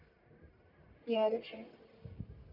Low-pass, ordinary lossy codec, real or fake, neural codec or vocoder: 5.4 kHz; none; fake; codec, 44.1 kHz, 1.7 kbps, Pupu-Codec